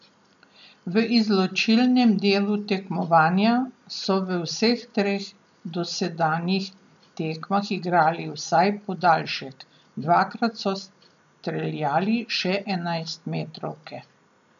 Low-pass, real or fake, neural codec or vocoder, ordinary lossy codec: 7.2 kHz; real; none; none